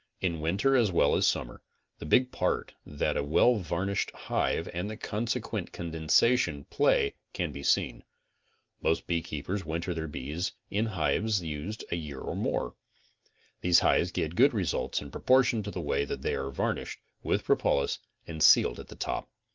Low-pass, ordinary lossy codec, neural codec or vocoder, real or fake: 7.2 kHz; Opus, 32 kbps; none; real